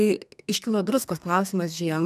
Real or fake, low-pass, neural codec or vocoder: fake; 14.4 kHz; codec, 32 kHz, 1.9 kbps, SNAC